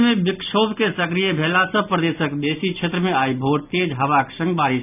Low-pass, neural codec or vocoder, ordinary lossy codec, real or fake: 3.6 kHz; none; none; real